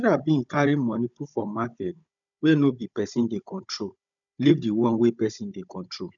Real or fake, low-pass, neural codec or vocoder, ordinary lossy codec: fake; 7.2 kHz; codec, 16 kHz, 16 kbps, FunCodec, trained on Chinese and English, 50 frames a second; none